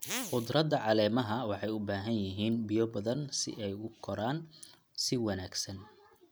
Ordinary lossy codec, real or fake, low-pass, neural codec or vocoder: none; real; none; none